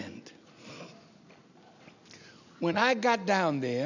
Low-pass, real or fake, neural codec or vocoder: 7.2 kHz; real; none